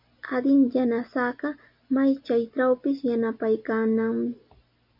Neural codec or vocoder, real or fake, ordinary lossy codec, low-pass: none; real; MP3, 48 kbps; 5.4 kHz